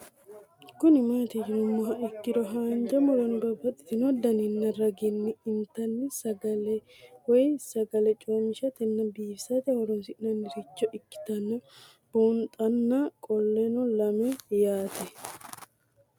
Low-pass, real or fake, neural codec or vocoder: 19.8 kHz; real; none